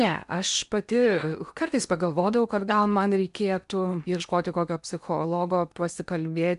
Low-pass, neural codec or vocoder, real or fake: 10.8 kHz; codec, 16 kHz in and 24 kHz out, 0.8 kbps, FocalCodec, streaming, 65536 codes; fake